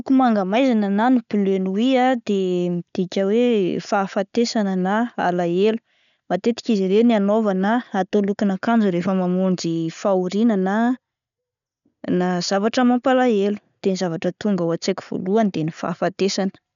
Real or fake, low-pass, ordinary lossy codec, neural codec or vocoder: real; 7.2 kHz; none; none